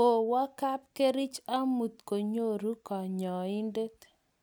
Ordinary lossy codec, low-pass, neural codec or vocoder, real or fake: none; none; none; real